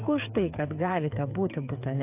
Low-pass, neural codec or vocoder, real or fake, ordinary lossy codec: 3.6 kHz; codec, 16 kHz, 8 kbps, FreqCodec, smaller model; fake; AAC, 32 kbps